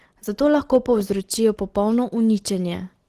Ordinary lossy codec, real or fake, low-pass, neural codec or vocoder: Opus, 16 kbps; real; 14.4 kHz; none